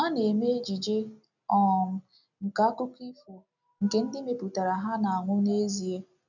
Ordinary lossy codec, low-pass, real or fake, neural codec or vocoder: none; 7.2 kHz; real; none